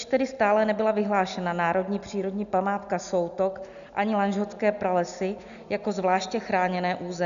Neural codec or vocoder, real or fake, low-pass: none; real; 7.2 kHz